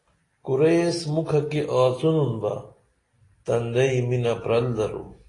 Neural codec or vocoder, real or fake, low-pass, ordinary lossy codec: none; real; 10.8 kHz; AAC, 32 kbps